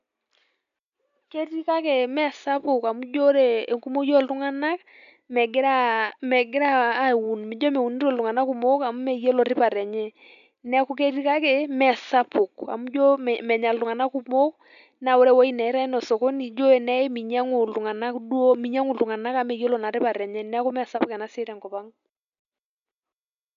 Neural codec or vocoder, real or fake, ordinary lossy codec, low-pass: none; real; none; 7.2 kHz